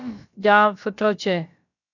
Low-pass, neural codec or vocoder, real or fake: 7.2 kHz; codec, 16 kHz, about 1 kbps, DyCAST, with the encoder's durations; fake